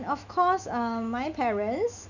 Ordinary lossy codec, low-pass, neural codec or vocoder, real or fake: none; 7.2 kHz; autoencoder, 48 kHz, 128 numbers a frame, DAC-VAE, trained on Japanese speech; fake